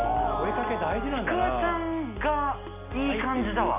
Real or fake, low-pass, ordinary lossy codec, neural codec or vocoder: real; 3.6 kHz; none; none